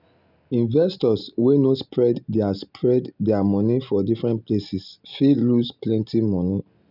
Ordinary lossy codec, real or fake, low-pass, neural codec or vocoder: none; real; 5.4 kHz; none